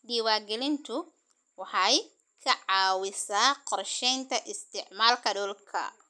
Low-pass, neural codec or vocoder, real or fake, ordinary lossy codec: none; none; real; none